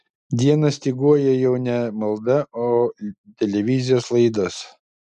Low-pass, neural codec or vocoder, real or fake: 10.8 kHz; none; real